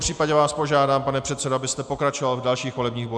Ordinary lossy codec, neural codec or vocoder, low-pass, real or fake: MP3, 96 kbps; none; 9.9 kHz; real